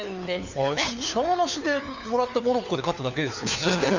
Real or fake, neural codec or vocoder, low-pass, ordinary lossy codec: fake; codec, 16 kHz, 4 kbps, FunCodec, trained on LibriTTS, 50 frames a second; 7.2 kHz; none